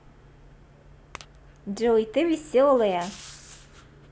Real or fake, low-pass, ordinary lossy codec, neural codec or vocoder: real; none; none; none